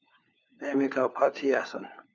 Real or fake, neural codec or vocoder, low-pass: fake; codec, 16 kHz, 4 kbps, FunCodec, trained on LibriTTS, 50 frames a second; 7.2 kHz